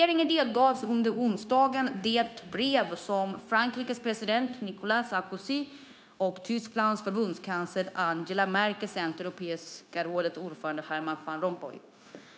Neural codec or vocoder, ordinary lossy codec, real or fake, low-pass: codec, 16 kHz, 0.9 kbps, LongCat-Audio-Codec; none; fake; none